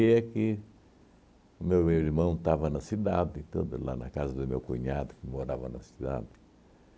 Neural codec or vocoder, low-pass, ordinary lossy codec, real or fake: none; none; none; real